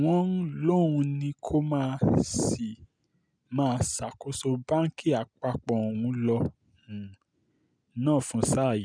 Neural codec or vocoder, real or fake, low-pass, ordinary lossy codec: none; real; 9.9 kHz; none